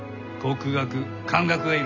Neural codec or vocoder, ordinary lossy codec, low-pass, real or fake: none; none; 7.2 kHz; real